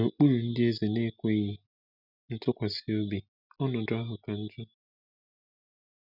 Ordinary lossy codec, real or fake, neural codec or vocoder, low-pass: none; real; none; 5.4 kHz